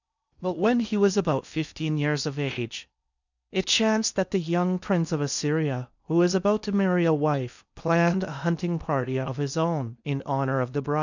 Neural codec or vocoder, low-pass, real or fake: codec, 16 kHz in and 24 kHz out, 0.6 kbps, FocalCodec, streaming, 2048 codes; 7.2 kHz; fake